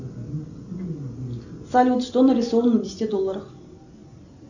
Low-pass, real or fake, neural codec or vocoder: 7.2 kHz; real; none